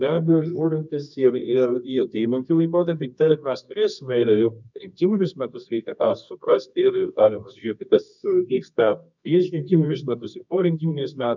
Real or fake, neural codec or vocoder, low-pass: fake; codec, 24 kHz, 0.9 kbps, WavTokenizer, medium music audio release; 7.2 kHz